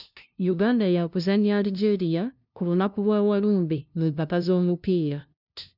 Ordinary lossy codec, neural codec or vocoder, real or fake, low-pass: none; codec, 16 kHz, 0.5 kbps, FunCodec, trained on LibriTTS, 25 frames a second; fake; 5.4 kHz